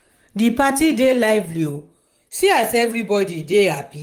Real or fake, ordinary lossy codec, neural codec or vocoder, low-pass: fake; Opus, 32 kbps; vocoder, 44.1 kHz, 128 mel bands, Pupu-Vocoder; 19.8 kHz